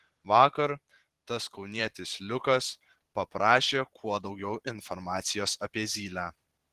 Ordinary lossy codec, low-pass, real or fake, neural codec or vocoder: Opus, 16 kbps; 14.4 kHz; fake; vocoder, 44.1 kHz, 128 mel bands every 512 samples, BigVGAN v2